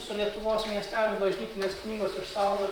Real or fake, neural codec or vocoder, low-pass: fake; vocoder, 44.1 kHz, 128 mel bands, Pupu-Vocoder; 19.8 kHz